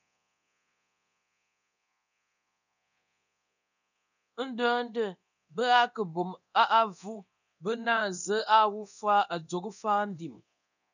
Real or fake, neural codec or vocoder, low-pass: fake; codec, 24 kHz, 0.9 kbps, DualCodec; 7.2 kHz